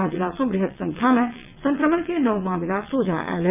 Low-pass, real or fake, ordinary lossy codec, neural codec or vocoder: 3.6 kHz; fake; none; vocoder, 22.05 kHz, 80 mel bands, WaveNeXt